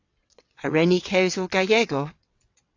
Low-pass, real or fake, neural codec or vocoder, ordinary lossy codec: 7.2 kHz; fake; vocoder, 22.05 kHz, 80 mel bands, WaveNeXt; AAC, 48 kbps